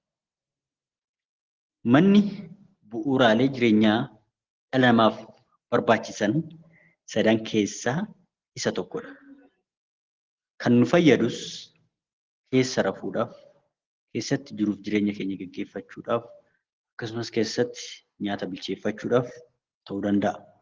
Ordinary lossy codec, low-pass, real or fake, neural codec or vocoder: Opus, 16 kbps; 7.2 kHz; real; none